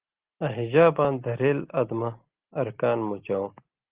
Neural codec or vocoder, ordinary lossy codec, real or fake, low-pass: none; Opus, 16 kbps; real; 3.6 kHz